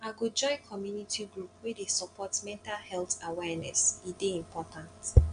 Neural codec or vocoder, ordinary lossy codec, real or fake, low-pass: none; none; real; 9.9 kHz